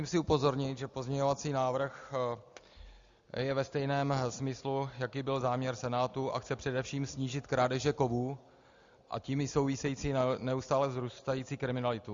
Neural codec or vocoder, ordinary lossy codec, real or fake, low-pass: none; Opus, 64 kbps; real; 7.2 kHz